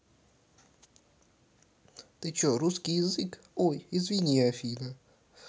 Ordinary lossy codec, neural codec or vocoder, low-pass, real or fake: none; none; none; real